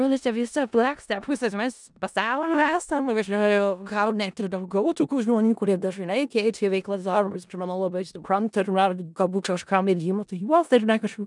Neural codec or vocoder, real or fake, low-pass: codec, 16 kHz in and 24 kHz out, 0.4 kbps, LongCat-Audio-Codec, four codebook decoder; fake; 10.8 kHz